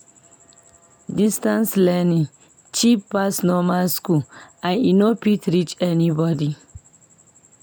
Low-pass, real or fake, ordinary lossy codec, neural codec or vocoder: none; real; none; none